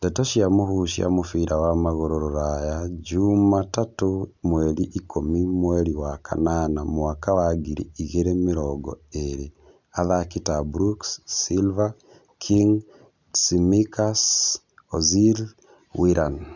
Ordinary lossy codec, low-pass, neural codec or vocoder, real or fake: none; 7.2 kHz; none; real